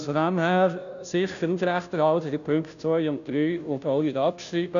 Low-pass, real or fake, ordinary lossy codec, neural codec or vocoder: 7.2 kHz; fake; MP3, 96 kbps; codec, 16 kHz, 0.5 kbps, FunCodec, trained on Chinese and English, 25 frames a second